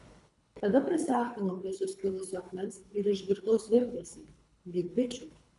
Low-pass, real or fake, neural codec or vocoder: 10.8 kHz; fake; codec, 24 kHz, 3 kbps, HILCodec